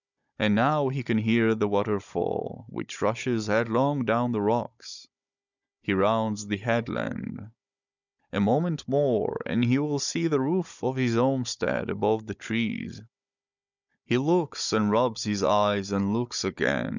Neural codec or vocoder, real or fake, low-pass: codec, 16 kHz, 16 kbps, FunCodec, trained on Chinese and English, 50 frames a second; fake; 7.2 kHz